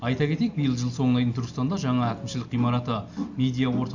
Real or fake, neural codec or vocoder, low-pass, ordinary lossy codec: real; none; 7.2 kHz; none